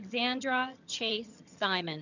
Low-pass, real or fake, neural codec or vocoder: 7.2 kHz; fake; vocoder, 22.05 kHz, 80 mel bands, HiFi-GAN